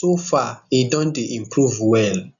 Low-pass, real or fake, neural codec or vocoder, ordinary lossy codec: 7.2 kHz; real; none; none